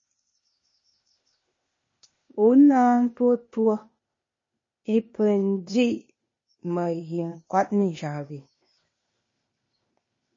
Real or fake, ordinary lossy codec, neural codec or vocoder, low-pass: fake; MP3, 32 kbps; codec, 16 kHz, 0.8 kbps, ZipCodec; 7.2 kHz